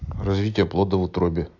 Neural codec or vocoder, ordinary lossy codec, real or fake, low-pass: none; AAC, 48 kbps; real; 7.2 kHz